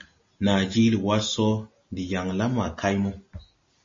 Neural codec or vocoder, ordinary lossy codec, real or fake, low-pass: none; MP3, 32 kbps; real; 7.2 kHz